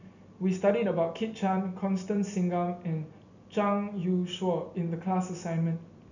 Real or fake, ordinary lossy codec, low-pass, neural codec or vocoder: real; none; 7.2 kHz; none